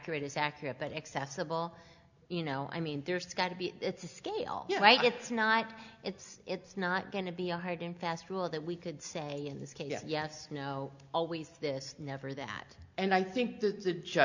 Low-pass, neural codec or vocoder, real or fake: 7.2 kHz; none; real